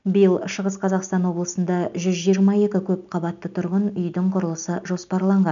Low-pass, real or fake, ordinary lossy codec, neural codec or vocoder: 7.2 kHz; real; none; none